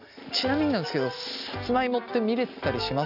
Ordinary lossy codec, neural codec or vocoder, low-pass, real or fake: none; none; 5.4 kHz; real